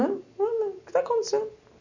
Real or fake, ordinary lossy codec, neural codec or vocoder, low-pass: real; none; none; 7.2 kHz